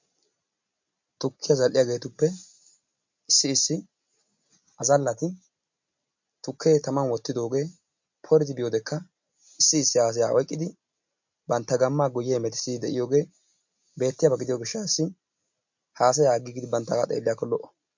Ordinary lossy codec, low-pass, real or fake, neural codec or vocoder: MP3, 48 kbps; 7.2 kHz; real; none